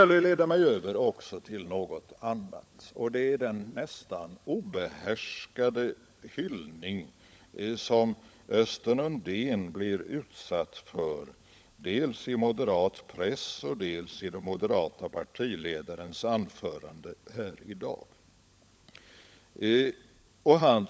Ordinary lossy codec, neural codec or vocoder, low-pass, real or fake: none; codec, 16 kHz, 16 kbps, FunCodec, trained on LibriTTS, 50 frames a second; none; fake